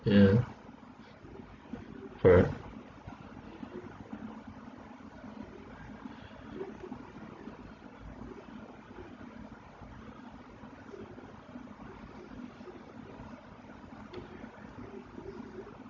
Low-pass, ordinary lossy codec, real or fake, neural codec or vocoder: 7.2 kHz; AAC, 32 kbps; real; none